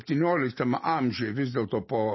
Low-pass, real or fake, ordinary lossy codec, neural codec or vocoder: 7.2 kHz; real; MP3, 24 kbps; none